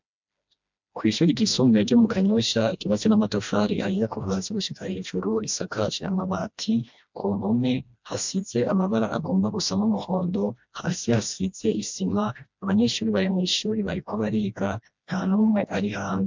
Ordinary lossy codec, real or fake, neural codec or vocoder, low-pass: MP3, 64 kbps; fake; codec, 16 kHz, 1 kbps, FreqCodec, smaller model; 7.2 kHz